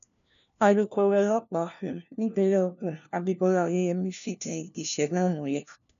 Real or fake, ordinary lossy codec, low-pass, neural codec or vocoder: fake; none; 7.2 kHz; codec, 16 kHz, 1 kbps, FunCodec, trained on LibriTTS, 50 frames a second